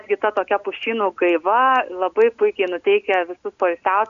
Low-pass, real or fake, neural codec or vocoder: 7.2 kHz; real; none